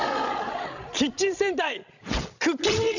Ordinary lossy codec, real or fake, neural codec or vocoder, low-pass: none; fake; codec, 16 kHz, 16 kbps, FreqCodec, larger model; 7.2 kHz